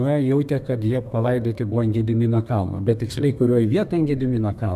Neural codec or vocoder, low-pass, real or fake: codec, 32 kHz, 1.9 kbps, SNAC; 14.4 kHz; fake